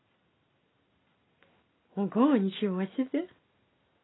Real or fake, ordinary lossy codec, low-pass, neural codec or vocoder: real; AAC, 16 kbps; 7.2 kHz; none